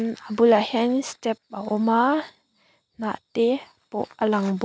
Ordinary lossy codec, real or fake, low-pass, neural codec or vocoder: none; real; none; none